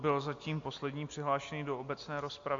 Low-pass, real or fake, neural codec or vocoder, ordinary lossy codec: 7.2 kHz; real; none; MP3, 48 kbps